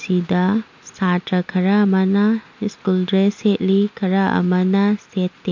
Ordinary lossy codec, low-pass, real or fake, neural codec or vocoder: MP3, 48 kbps; 7.2 kHz; real; none